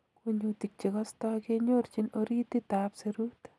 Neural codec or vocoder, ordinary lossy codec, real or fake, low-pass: none; none; real; none